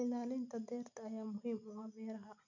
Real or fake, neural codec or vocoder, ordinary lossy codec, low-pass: real; none; none; 7.2 kHz